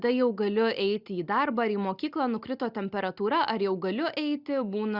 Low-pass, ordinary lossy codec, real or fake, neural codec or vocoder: 5.4 kHz; Opus, 64 kbps; real; none